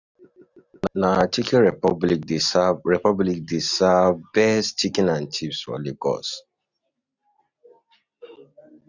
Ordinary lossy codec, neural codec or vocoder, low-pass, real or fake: none; none; 7.2 kHz; real